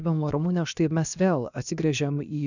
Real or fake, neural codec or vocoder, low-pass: fake; codec, 16 kHz, about 1 kbps, DyCAST, with the encoder's durations; 7.2 kHz